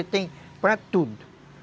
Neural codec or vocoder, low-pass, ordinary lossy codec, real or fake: none; none; none; real